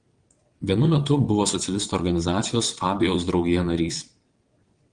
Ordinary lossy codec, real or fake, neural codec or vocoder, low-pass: Opus, 24 kbps; fake; vocoder, 22.05 kHz, 80 mel bands, WaveNeXt; 9.9 kHz